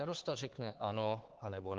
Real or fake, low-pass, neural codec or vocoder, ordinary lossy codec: fake; 7.2 kHz; codec, 16 kHz, 4 kbps, X-Codec, HuBERT features, trained on LibriSpeech; Opus, 16 kbps